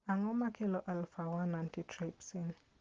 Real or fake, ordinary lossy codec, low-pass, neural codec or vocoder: real; Opus, 16 kbps; 7.2 kHz; none